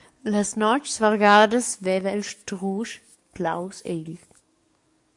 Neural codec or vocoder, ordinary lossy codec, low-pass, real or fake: codec, 44.1 kHz, 7.8 kbps, DAC; MP3, 64 kbps; 10.8 kHz; fake